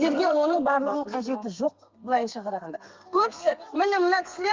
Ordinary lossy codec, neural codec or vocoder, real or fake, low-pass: Opus, 32 kbps; codec, 32 kHz, 1.9 kbps, SNAC; fake; 7.2 kHz